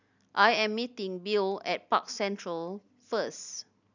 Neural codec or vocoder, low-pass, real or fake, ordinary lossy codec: none; 7.2 kHz; real; none